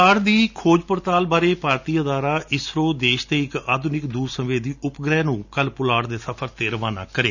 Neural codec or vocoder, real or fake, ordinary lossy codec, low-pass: none; real; none; 7.2 kHz